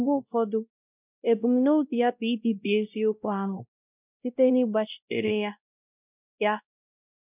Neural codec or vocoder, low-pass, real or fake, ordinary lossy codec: codec, 16 kHz, 0.5 kbps, X-Codec, WavLM features, trained on Multilingual LibriSpeech; 3.6 kHz; fake; none